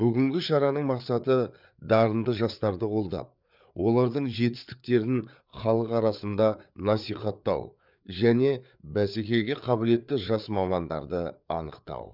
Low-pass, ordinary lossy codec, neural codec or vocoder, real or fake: 5.4 kHz; none; codec, 16 kHz, 4 kbps, FreqCodec, larger model; fake